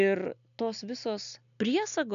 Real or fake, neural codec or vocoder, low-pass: real; none; 7.2 kHz